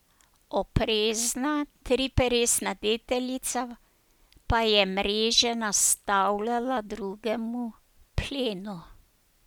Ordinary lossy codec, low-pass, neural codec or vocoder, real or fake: none; none; none; real